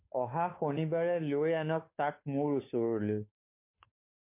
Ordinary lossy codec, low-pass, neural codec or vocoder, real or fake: MP3, 24 kbps; 3.6 kHz; codec, 16 kHz, 2 kbps, FunCodec, trained on Chinese and English, 25 frames a second; fake